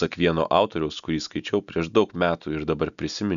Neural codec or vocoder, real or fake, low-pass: none; real; 7.2 kHz